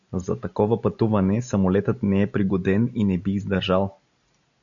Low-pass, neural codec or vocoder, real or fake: 7.2 kHz; none; real